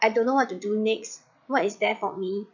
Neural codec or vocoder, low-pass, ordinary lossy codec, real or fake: vocoder, 22.05 kHz, 80 mel bands, Vocos; 7.2 kHz; none; fake